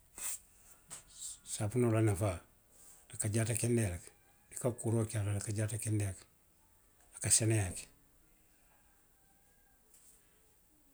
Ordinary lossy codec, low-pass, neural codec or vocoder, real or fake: none; none; none; real